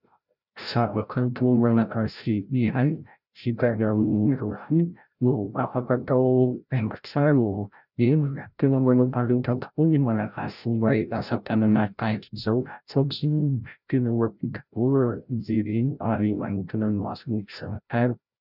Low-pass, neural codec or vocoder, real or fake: 5.4 kHz; codec, 16 kHz, 0.5 kbps, FreqCodec, larger model; fake